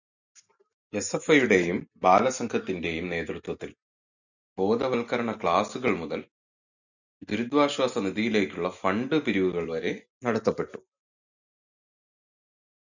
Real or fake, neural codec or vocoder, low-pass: real; none; 7.2 kHz